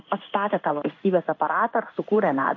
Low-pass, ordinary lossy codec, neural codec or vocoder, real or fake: 7.2 kHz; MP3, 48 kbps; codec, 16 kHz in and 24 kHz out, 1 kbps, XY-Tokenizer; fake